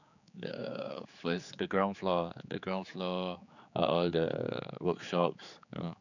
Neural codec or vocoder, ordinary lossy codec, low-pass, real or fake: codec, 16 kHz, 4 kbps, X-Codec, HuBERT features, trained on general audio; none; 7.2 kHz; fake